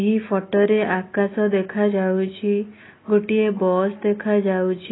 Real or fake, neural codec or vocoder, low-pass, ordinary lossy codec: real; none; 7.2 kHz; AAC, 16 kbps